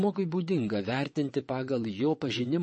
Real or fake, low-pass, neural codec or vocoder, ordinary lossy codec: fake; 9.9 kHz; vocoder, 22.05 kHz, 80 mel bands, WaveNeXt; MP3, 32 kbps